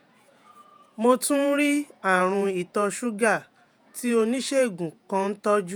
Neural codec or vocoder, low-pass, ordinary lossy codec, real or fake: vocoder, 48 kHz, 128 mel bands, Vocos; none; none; fake